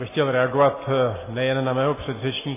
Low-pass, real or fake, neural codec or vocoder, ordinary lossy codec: 3.6 kHz; real; none; MP3, 16 kbps